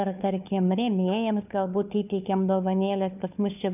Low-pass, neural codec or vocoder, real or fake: 3.6 kHz; codec, 16 kHz, 4 kbps, FunCodec, trained on LibriTTS, 50 frames a second; fake